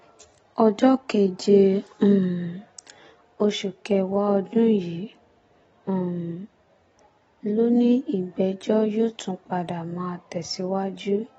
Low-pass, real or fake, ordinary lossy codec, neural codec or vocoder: 19.8 kHz; real; AAC, 24 kbps; none